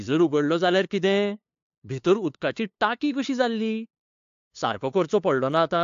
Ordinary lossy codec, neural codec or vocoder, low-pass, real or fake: AAC, 64 kbps; codec, 16 kHz, 2 kbps, FunCodec, trained on LibriTTS, 25 frames a second; 7.2 kHz; fake